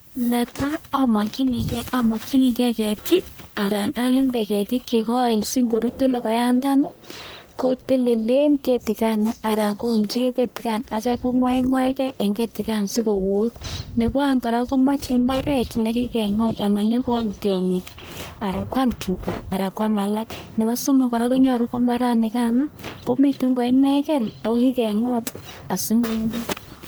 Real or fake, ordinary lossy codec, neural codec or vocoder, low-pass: fake; none; codec, 44.1 kHz, 1.7 kbps, Pupu-Codec; none